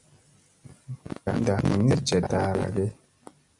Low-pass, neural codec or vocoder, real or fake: 10.8 kHz; none; real